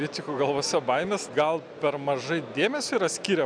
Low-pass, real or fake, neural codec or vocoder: 9.9 kHz; real; none